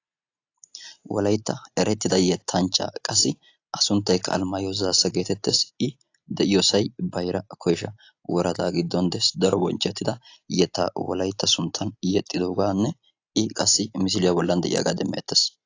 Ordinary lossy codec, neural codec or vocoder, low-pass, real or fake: AAC, 48 kbps; none; 7.2 kHz; real